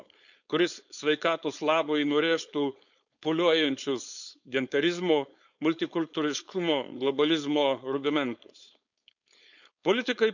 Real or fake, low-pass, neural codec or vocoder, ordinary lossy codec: fake; 7.2 kHz; codec, 16 kHz, 4.8 kbps, FACodec; none